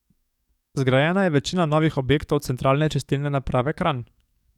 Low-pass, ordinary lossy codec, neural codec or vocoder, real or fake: 19.8 kHz; none; codec, 44.1 kHz, 7.8 kbps, DAC; fake